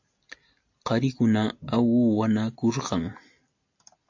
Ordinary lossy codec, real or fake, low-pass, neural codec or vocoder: AAC, 48 kbps; real; 7.2 kHz; none